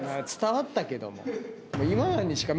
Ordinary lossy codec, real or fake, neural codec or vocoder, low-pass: none; real; none; none